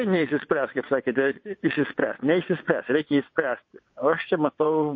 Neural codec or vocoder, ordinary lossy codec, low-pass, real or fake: vocoder, 22.05 kHz, 80 mel bands, WaveNeXt; MP3, 32 kbps; 7.2 kHz; fake